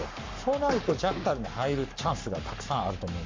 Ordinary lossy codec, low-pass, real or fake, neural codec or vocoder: MP3, 64 kbps; 7.2 kHz; fake; codec, 44.1 kHz, 7.8 kbps, Pupu-Codec